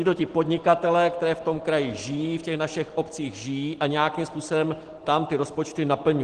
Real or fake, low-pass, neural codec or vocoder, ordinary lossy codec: real; 9.9 kHz; none; Opus, 16 kbps